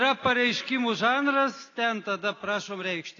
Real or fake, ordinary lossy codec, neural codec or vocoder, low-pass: real; AAC, 32 kbps; none; 7.2 kHz